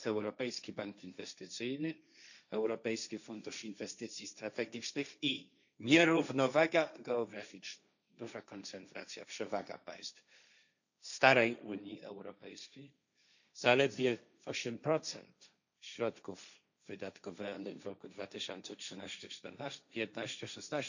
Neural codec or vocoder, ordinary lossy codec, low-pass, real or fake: codec, 16 kHz, 1.1 kbps, Voila-Tokenizer; none; 7.2 kHz; fake